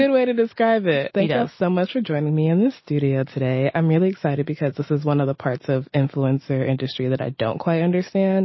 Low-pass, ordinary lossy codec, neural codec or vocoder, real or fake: 7.2 kHz; MP3, 24 kbps; none; real